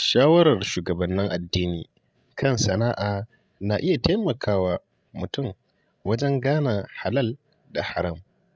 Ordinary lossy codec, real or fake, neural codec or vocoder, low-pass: none; fake; codec, 16 kHz, 16 kbps, FreqCodec, larger model; none